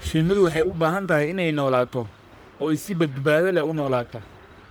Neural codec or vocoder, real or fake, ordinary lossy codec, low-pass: codec, 44.1 kHz, 1.7 kbps, Pupu-Codec; fake; none; none